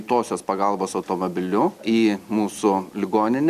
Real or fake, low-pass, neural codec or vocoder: real; 14.4 kHz; none